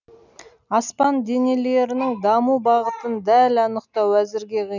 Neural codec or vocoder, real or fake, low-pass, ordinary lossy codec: none; real; 7.2 kHz; none